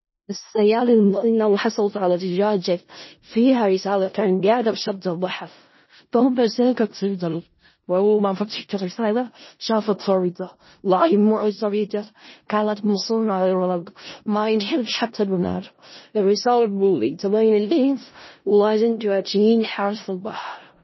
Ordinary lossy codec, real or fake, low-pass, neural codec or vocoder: MP3, 24 kbps; fake; 7.2 kHz; codec, 16 kHz in and 24 kHz out, 0.4 kbps, LongCat-Audio-Codec, four codebook decoder